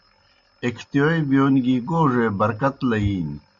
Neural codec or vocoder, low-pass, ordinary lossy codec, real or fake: none; 7.2 kHz; Opus, 64 kbps; real